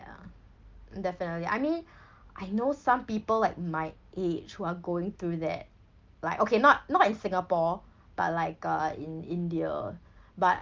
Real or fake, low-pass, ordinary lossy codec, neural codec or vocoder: real; 7.2 kHz; Opus, 32 kbps; none